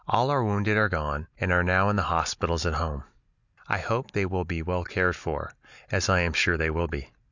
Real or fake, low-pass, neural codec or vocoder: real; 7.2 kHz; none